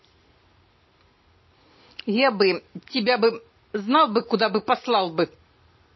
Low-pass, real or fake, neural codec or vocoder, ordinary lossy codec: 7.2 kHz; real; none; MP3, 24 kbps